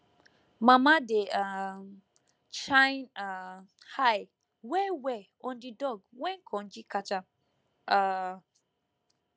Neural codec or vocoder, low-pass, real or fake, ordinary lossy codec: none; none; real; none